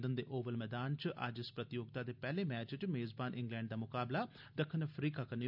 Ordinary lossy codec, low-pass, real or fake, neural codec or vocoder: none; 5.4 kHz; real; none